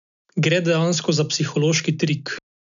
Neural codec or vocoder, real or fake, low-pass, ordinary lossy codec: none; real; 7.2 kHz; none